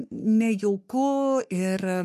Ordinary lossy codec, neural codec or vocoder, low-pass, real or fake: MP3, 64 kbps; codec, 44.1 kHz, 3.4 kbps, Pupu-Codec; 14.4 kHz; fake